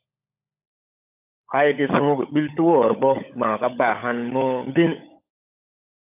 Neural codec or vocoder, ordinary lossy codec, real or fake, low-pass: codec, 16 kHz, 16 kbps, FunCodec, trained on LibriTTS, 50 frames a second; AAC, 32 kbps; fake; 3.6 kHz